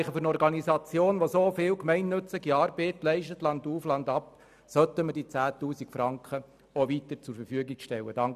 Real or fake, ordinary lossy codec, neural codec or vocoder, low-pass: real; none; none; 14.4 kHz